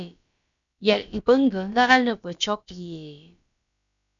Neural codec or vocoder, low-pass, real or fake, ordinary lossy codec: codec, 16 kHz, about 1 kbps, DyCAST, with the encoder's durations; 7.2 kHz; fake; MP3, 96 kbps